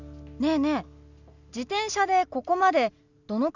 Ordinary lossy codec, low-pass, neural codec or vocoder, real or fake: none; 7.2 kHz; none; real